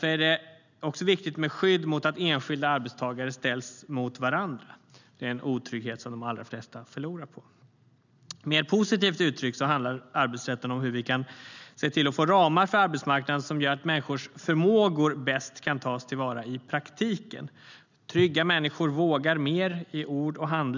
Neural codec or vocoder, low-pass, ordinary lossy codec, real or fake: none; 7.2 kHz; none; real